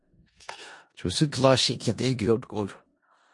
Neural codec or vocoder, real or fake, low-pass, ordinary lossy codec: codec, 16 kHz in and 24 kHz out, 0.4 kbps, LongCat-Audio-Codec, four codebook decoder; fake; 10.8 kHz; MP3, 48 kbps